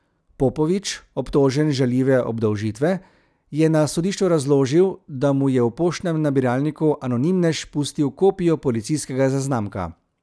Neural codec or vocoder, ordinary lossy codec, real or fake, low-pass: none; none; real; none